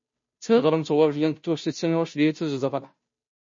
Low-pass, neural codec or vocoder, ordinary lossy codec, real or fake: 7.2 kHz; codec, 16 kHz, 0.5 kbps, FunCodec, trained on Chinese and English, 25 frames a second; MP3, 32 kbps; fake